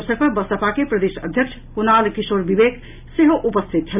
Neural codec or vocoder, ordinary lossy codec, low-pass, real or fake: none; none; 3.6 kHz; real